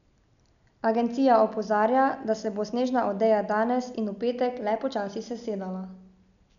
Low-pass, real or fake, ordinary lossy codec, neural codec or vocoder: 7.2 kHz; real; none; none